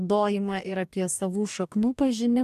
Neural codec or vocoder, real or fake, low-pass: codec, 44.1 kHz, 2.6 kbps, DAC; fake; 14.4 kHz